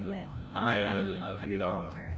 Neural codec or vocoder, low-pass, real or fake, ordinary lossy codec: codec, 16 kHz, 0.5 kbps, FreqCodec, larger model; none; fake; none